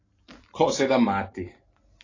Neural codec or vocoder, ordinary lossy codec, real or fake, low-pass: none; AAC, 32 kbps; real; 7.2 kHz